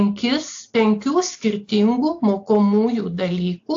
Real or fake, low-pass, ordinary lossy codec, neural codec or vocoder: real; 7.2 kHz; AAC, 48 kbps; none